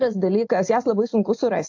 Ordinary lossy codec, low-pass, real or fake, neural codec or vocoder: AAC, 48 kbps; 7.2 kHz; real; none